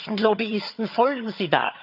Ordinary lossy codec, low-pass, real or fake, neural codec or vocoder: none; 5.4 kHz; fake; vocoder, 22.05 kHz, 80 mel bands, HiFi-GAN